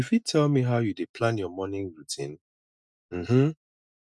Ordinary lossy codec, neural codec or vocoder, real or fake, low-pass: none; none; real; none